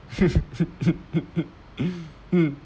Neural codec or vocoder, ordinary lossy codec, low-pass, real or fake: none; none; none; real